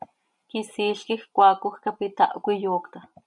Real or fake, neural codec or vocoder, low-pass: real; none; 10.8 kHz